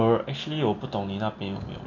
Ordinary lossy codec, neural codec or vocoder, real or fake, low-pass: none; none; real; 7.2 kHz